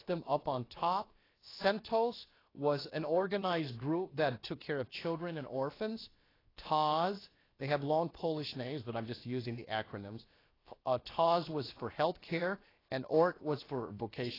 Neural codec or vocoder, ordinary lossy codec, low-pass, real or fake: codec, 16 kHz, about 1 kbps, DyCAST, with the encoder's durations; AAC, 24 kbps; 5.4 kHz; fake